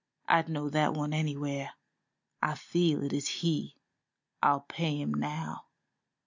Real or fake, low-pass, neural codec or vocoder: real; 7.2 kHz; none